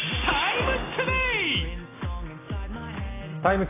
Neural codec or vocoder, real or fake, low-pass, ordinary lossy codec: none; real; 3.6 kHz; AAC, 16 kbps